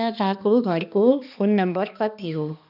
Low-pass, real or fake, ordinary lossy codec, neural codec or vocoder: 5.4 kHz; fake; none; codec, 16 kHz, 2 kbps, X-Codec, HuBERT features, trained on balanced general audio